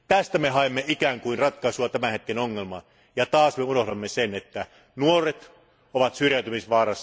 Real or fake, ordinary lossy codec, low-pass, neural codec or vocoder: real; none; none; none